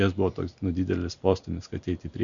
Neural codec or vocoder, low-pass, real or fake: none; 7.2 kHz; real